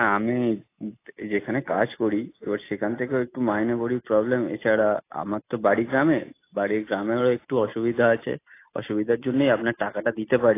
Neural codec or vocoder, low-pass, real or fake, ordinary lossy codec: none; 3.6 kHz; real; AAC, 24 kbps